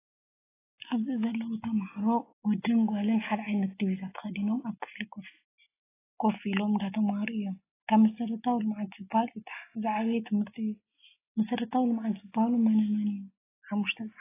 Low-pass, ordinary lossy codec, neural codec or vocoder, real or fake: 3.6 kHz; AAC, 16 kbps; none; real